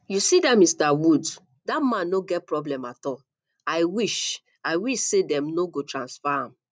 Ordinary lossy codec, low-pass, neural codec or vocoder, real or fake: none; none; none; real